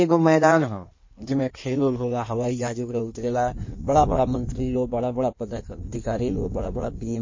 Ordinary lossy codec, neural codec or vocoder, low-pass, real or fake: MP3, 32 kbps; codec, 16 kHz in and 24 kHz out, 1.1 kbps, FireRedTTS-2 codec; 7.2 kHz; fake